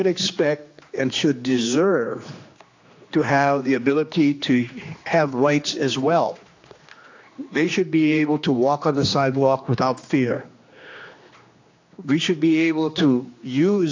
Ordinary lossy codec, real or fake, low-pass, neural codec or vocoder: AAC, 48 kbps; fake; 7.2 kHz; codec, 16 kHz, 2 kbps, X-Codec, HuBERT features, trained on general audio